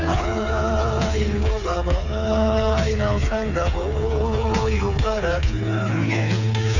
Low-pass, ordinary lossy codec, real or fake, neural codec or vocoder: 7.2 kHz; none; fake; codec, 16 kHz, 4 kbps, FreqCodec, smaller model